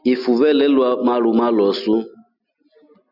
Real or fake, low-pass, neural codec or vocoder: real; 5.4 kHz; none